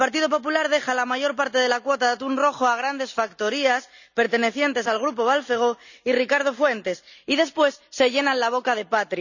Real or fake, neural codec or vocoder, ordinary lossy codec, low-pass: real; none; none; 7.2 kHz